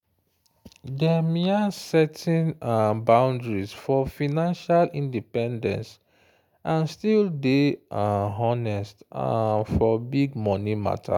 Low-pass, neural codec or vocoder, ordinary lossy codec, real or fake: 19.8 kHz; none; none; real